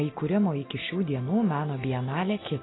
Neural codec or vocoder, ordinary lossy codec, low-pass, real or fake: none; AAC, 16 kbps; 7.2 kHz; real